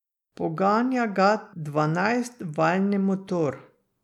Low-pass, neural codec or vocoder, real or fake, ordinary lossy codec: 19.8 kHz; none; real; none